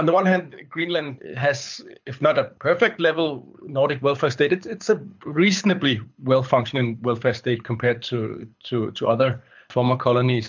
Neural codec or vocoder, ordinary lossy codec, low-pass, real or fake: codec, 24 kHz, 6 kbps, HILCodec; MP3, 64 kbps; 7.2 kHz; fake